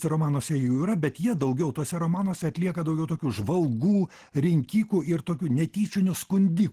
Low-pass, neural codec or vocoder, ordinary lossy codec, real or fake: 14.4 kHz; none; Opus, 16 kbps; real